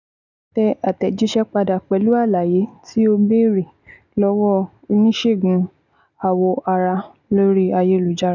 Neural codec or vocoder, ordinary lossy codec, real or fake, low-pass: none; none; real; 7.2 kHz